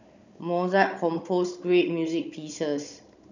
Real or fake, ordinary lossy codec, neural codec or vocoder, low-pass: fake; none; codec, 16 kHz, 16 kbps, FunCodec, trained on Chinese and English, 50 frames a second; 7.2 kHz